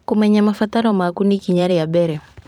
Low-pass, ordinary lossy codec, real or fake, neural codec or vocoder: 19.8 kHz; none; fake; vocoder, 44.1 kHz, 128 mel bands every 512 samples, BigVGAN v2